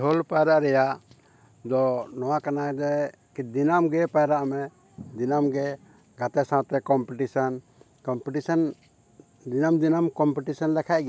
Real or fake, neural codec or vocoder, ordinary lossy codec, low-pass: real; none; none; none